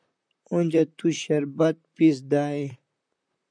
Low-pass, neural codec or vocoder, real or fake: 9.9 kHz; vocoder, 44.1 kHz, 128 mel bands, Pupu-Vocoder; fake